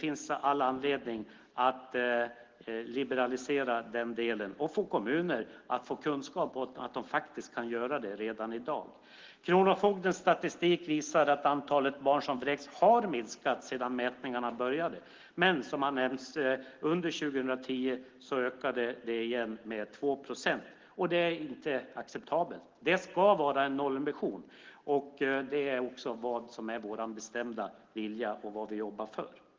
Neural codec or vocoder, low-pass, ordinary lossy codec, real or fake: none; 7.2 kHz; Opus, 16 kbps; real